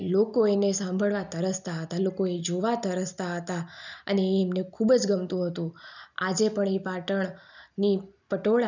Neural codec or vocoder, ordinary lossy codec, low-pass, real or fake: none; none; 7.2 kHz; real